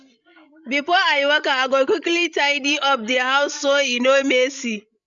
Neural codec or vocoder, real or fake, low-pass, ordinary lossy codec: codec, 16 kHz, 16 kbps, FreqCodec, larger model; fake; 7.2 kHz; MP3, 64 kbps